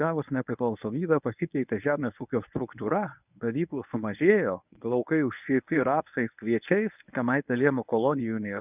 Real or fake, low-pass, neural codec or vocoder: fake; 3.6 kHz; codec, 24 kHz, 0.9 kbps, WavTokenizer, medium speech release version 1